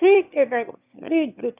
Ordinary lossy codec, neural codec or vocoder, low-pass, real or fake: none; autoencoder, 22.05 kHz, a latent of 192 numbers a frame, VITS, trained on one speaker; 3.6 kHz; fake